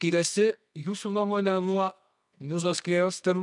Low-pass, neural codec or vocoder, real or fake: 10.8 kHz; codec, 24 kHz, 0.9 kbps, WavTokenizer, medium music audio release; fake